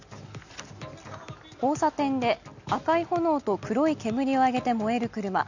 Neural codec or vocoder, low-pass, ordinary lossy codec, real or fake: none; 7.2 kHz; none; real